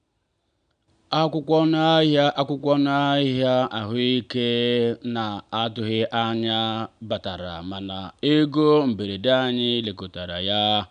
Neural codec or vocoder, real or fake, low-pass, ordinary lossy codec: none; real; 9.9 kHz; none